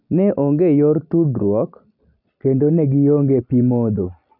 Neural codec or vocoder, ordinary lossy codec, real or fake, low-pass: none; none; real; 5.4 kHz